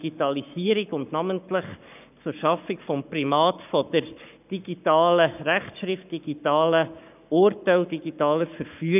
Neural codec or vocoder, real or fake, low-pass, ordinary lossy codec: codec, 44.1 kHz, 7.8 kbps, Pupu-Codec; fake; 3.6 kHz; none